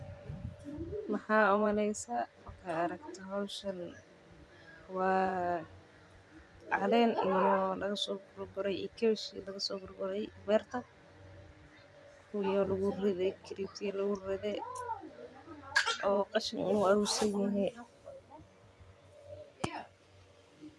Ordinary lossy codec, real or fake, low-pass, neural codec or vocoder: none; fake; none; vocoder, 24 kHz, 100 mel bands, Vocos